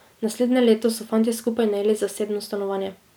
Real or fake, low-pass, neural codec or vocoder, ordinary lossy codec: real; none; none; none